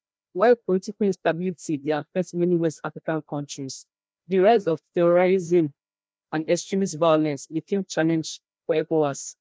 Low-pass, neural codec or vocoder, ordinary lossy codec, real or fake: none; codec, 16 kHz, 1 kbps, FreqCodec, larger model; none; fake